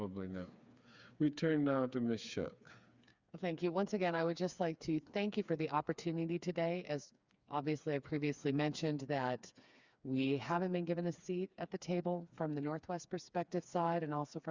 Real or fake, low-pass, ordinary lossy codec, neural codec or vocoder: fake; 7.2 kHz; Opus, 64 kbps; codec, 16 kHz, 4 kbps, FreqCodec, smaller model